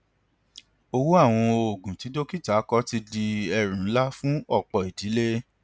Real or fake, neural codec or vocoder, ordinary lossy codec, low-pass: real; none; none; none